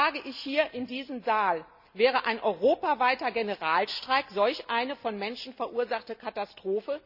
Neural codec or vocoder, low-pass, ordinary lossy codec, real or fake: none; 5.4 kHz; none; real